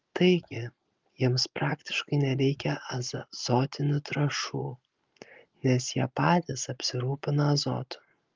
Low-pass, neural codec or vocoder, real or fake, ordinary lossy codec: 7.2 kHz; none; real; Opus, 24 kbps